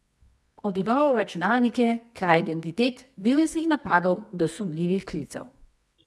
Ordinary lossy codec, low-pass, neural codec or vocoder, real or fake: none; none; codec, 24 kHz, 0.9 kbps, WavTokenizer, medium music audio release; fake